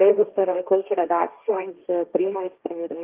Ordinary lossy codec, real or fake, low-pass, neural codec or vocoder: Opus, 32 kbps; fake; 3.6 kHz; codec, 16 kHz, 1.1 kbps, Voila-Tokenizer